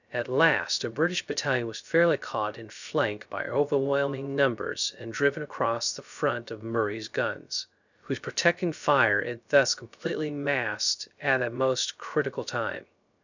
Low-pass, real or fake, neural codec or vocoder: 7.2 kHz; fake; codec, 16 kHz, 0.3 kbps, FocalCodec